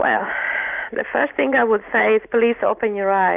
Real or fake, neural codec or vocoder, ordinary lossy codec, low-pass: real; none; Opus, 64 kbps; 3.6 kHz